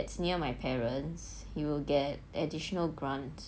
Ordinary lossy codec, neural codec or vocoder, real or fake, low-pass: none; none; real; none